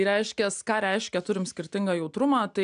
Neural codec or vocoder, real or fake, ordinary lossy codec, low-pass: none; real; MP3, 96 kbps; 9.9 kHz